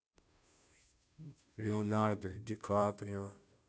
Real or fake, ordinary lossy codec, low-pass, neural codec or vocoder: fake; none; none; codec, 16 kHz, 0.5 kbps, FunCodec, trained on Chinese and English, 25 frames a second